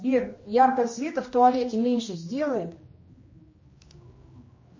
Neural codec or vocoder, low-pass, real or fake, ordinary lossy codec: codec, 16 kHz, 1 kbps, X-Codec, HuBERT features, trained on general audio; 7.2 kHz; fake; MP3, 32 kbps